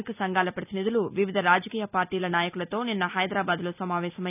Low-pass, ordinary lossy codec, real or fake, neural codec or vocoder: 3.6 kHz; none; real; none